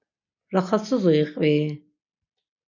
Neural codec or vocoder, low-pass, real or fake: none; 7.2 kHz; real